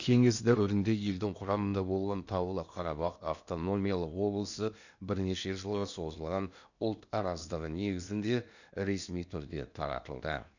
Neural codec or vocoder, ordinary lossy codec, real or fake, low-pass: codec, 16 kHz in and 24 kHz out, 0.8 kbps, FocalCodec, streaming, 65536 codes; none; fake; 7.2 kHz